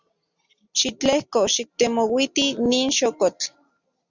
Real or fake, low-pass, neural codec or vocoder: real; 7.2 kHz; none